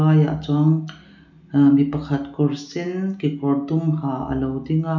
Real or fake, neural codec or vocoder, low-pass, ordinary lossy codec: real; none; 7.2 kHz; none